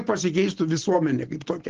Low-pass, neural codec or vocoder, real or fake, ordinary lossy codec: 7.2 kHz; none; real; Opus, 16 kbps